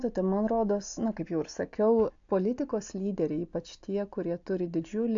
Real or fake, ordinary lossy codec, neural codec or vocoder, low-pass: real; AAC, 64 kbps; none; 7.2 kHz